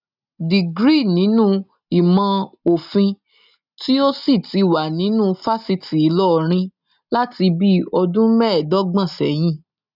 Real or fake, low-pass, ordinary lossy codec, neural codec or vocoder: real; 5.4 kHz; none; none